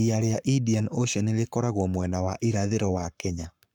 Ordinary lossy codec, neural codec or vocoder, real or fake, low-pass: none; codec, 44.1 kHz, 7.8 kbps, Pupu-Codec; fake; 19.8 kHz